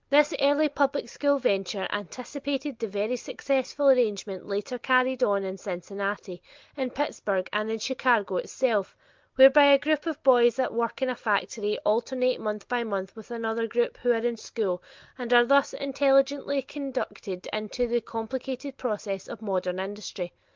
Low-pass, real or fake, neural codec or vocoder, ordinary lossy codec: 7.2 kHz; real; none; Opus, 32 kbps